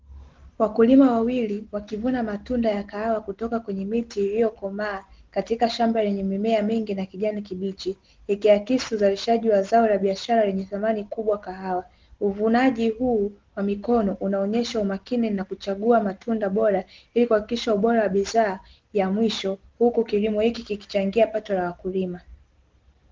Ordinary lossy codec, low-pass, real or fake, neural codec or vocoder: Opus, 16 kbps; 7.2 kHz; real; none